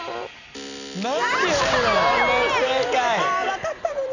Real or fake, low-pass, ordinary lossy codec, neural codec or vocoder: real; 7.2 kHz; none; none